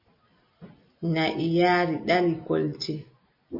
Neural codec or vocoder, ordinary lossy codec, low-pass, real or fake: none; MP3, 24 kbps; 5.4 kHz; real